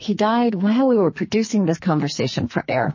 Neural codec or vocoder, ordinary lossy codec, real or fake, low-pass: codec, 44.1 kHz, 2.6 kbps, SNAC; MP3, 32 kbps; fake; 7.2 kHz